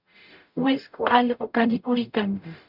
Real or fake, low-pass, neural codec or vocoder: fake; 5.4 kHz; codec, 44.1 kHz, 0.9 kbps, DAC